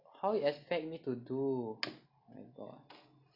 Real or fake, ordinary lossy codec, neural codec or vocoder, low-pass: real; none; none; 5.4 kHz